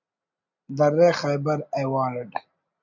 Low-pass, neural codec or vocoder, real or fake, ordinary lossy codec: 7.2 kHz; none; real; MP3, 64 kbps